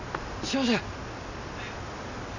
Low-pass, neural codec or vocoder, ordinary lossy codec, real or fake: 7.2 kHz; none; none; real